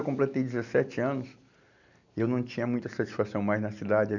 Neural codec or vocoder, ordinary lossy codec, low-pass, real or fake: none; none; 7.2 kHz; real